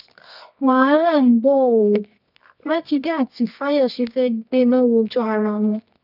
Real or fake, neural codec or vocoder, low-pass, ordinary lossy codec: fake; codec, 24 kHz, 0.9 kbps, WavTokenizer, medium music audio release; 5.4 kHz; none